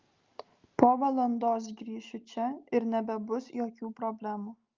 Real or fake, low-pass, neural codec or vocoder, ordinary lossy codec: real; 7.2 kHz; none; Opus, 24 kbps